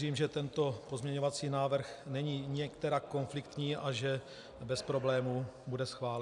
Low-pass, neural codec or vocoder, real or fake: 10.8 kHz; none; real